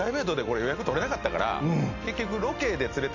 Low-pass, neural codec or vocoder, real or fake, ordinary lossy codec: 7.2 kHz; none; real; AAC, 48 kbps